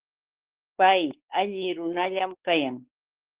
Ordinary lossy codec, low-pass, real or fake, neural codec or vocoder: Opus, 16 kbps; 3.6 kHz; fake; codec, 16 kHz, 4 kbps, X-Codec, WavLM features, trained on Multilingual LibriSpeech